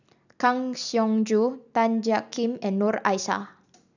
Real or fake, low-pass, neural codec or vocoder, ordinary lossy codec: real; 7.2 kHz; none; none